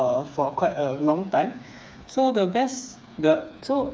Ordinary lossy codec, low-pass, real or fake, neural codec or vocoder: none; none; fake; codec, 16 kHz, 4 kbps, FreqCodec, smaller model